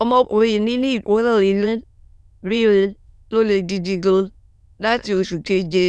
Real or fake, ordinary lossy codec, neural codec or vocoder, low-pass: fake; none; autoencoder, 22.05 kHz, a latent of 192 numbers a frame, VITS, trained on many speakers; none